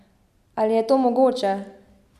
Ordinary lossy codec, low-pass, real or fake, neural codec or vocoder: none; 14.4 kHz; real; none